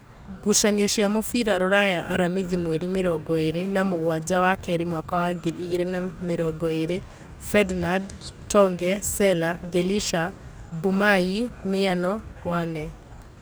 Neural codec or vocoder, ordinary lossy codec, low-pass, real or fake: codec, 44.1 kHz, 2.6 kbps, DAC; none; none; fake